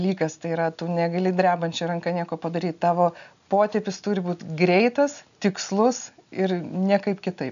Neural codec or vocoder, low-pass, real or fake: none; 7.2 kHz; real